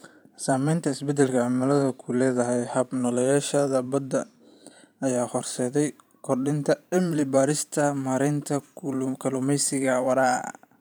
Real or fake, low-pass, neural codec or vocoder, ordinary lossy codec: fake; none; vocoder, 44.1 kHz, 128 mel bands every 512 samples, BigVGAN v2; none